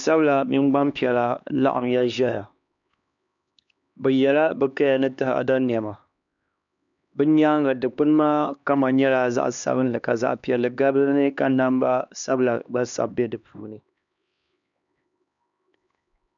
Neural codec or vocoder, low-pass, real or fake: codec, 16 kHz, 2 kbps, X-Codec, HuBERT features, trained on LibriSpeech; 7.2 kHz; fake